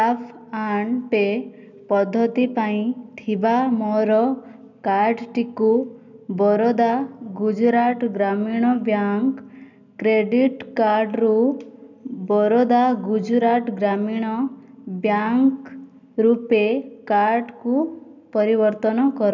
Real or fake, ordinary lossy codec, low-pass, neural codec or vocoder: real; none; 7.2 kHz; none